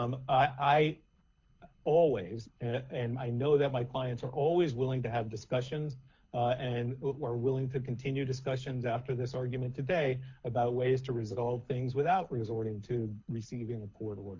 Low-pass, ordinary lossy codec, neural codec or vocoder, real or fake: 7.2 kHz; MP3, 48 kbps; codec, 24 kHz, 6 kbps, HILCodec; fake